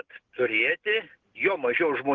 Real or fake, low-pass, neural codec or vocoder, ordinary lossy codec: real; 7.2 kHz; none; Opus, 16 kbps